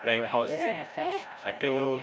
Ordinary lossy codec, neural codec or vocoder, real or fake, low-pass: none; codec, 16 kHz, 0.5 kbps, FreqCodec, larger model; fake; none